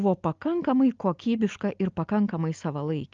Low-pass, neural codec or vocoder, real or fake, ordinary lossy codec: 7.2 kHz; none; real; Opus, 32 kbps